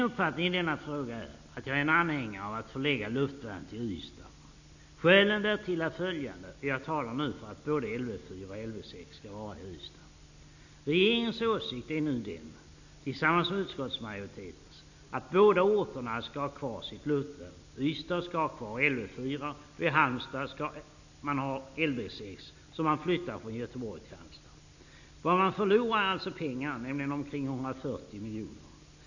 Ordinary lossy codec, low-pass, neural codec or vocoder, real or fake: none; 7.2 kHz; none; real